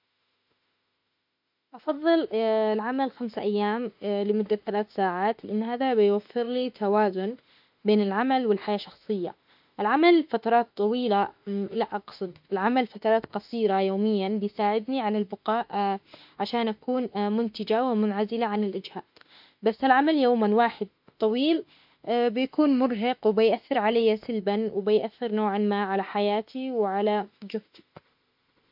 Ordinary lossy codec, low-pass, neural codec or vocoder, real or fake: MP3, 48 kbps; 5.4 kHz; autoencoder, 48 kHz, 32 numbers a frame, DAC-VAE, trained on Japanese speech; fake